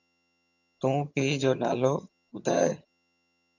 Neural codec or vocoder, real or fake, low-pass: vocoder, 22.05 kHz, 80 mel bands, HiFi-GAN; fake; 7.2 kHz